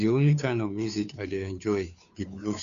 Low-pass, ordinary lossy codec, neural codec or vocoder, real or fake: 7.2 kHz; none; codec, 16 kHz, 2 kbps, FunCodec, trained on Chinese and English, 25 frames a second; fake